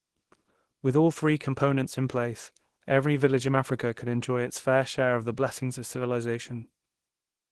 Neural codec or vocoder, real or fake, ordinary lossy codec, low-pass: codec, 24 kHz, 0.9 kbps, WavTokenizer, small release; fake; Opus, 16 kbps; 10.8 kHz